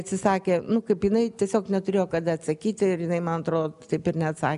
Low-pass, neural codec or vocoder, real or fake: 10.8 kHz; none; real